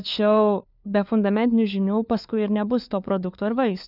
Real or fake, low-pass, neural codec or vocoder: fake; 5.4 kHz; codec, 16 kHz, 4 kbps, FunCodec, trained on LibriTTS, 50 frames a second